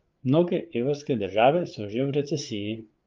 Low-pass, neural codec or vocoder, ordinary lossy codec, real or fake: 7.2 kHz; codec, 16 kHz, 8 kbps, FreqCodec, larger model; Opus, 32 kbps; fake